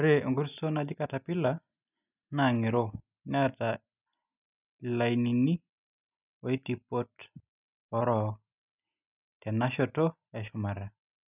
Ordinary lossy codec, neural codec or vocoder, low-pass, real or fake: none; none; 3.6 kHz; real